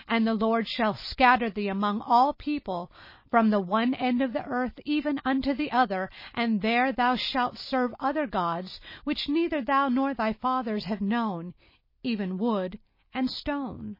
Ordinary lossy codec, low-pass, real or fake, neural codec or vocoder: MP3, 24 kbps; 5.4 kHz; real; none